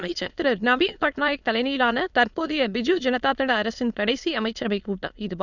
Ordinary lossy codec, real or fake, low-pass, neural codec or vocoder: none; fake; 7.2 kHz; autoencoder, 22.05 kHz, a latent of 192 numbers a frame, VITS, trained on many speakers